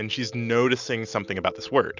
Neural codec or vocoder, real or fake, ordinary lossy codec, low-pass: none; real; Opus, 64 kbps; 7.2 kHz